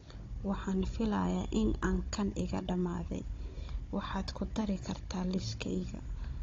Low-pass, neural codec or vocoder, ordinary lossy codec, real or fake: 7.2 kHz; codec, 16 kHz, 16 kbps, FunCodec, trained on Chinese and English, 50 frames a second; AAC, 32 kbps; fake